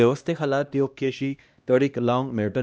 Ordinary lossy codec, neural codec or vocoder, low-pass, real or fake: none; codec, 16 kHz, 1 kbps, X-Codec, HuBERT features, trained on LibriSpeech; none; fake